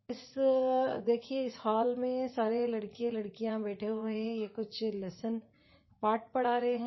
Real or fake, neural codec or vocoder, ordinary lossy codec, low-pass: fake; vocoder, 44.1 kHz, 80 mel bands, Vocos; MP3, 24 kbps; 7.2 kHz